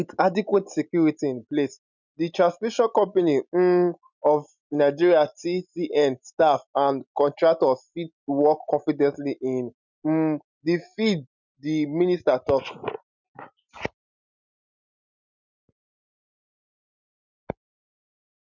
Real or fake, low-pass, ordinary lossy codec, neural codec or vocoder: real; 7.2 kHz; none; none